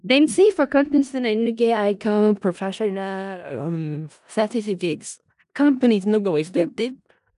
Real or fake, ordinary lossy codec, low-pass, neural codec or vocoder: fake; none; 10.8 kHz; codec, 16 kHz in and 24 kHz out, 0.4 kbps, LongCat-Audio-Codec, four codebook decoder